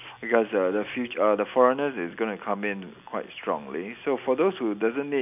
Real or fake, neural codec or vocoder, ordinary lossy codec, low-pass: real; none; none; 3.6 kHz